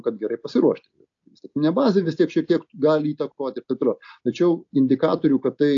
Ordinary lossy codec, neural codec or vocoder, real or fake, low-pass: AAC, 48 kbps; none; real; 7.2 kHz